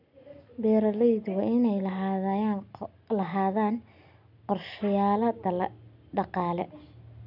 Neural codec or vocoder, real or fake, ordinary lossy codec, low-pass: none; real; none; 5.4 kHz